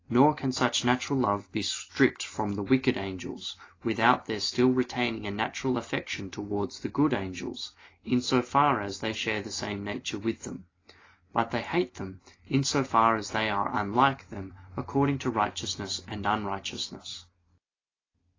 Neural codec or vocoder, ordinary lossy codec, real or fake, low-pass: none; AAC, 32 kbps; real; 7.2 kHz